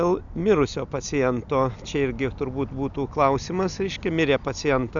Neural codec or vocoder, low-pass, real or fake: none; 7.2 kHz; real